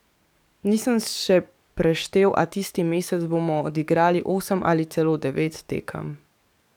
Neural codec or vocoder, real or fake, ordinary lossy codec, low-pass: codec, 44.1 kHz, 7.8 kbps, DAC; fake; none; 19.8 kHz